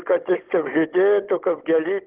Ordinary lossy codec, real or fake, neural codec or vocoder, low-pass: Opus, 16 kbps; fake; codec, 16 kHz, 16 kbps, FunCodec, trained on Chinese and English, 50 frames a second; 3.6 kHz